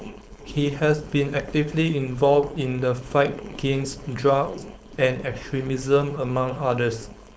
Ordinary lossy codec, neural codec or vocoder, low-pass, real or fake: none; codec, 16 kHz, 4.8 kbps, FACodec; none; fake